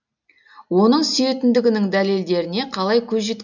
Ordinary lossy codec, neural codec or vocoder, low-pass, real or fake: none; none; 7.2 kHz; real